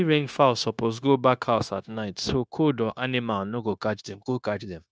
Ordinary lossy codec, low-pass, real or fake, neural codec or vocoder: none; none; fake; codec, 16 kHz, 0.9 kbps, LongCat-Audio-Codec